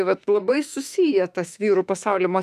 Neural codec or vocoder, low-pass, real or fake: autoencoder, 48 kHz, 32 numbers a frame, DAC-VAE, trained on Japanese speech; 14.4 kHz; fake